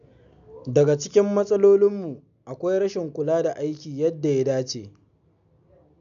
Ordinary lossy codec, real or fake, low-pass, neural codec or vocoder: none; real; 7.2 kHz; none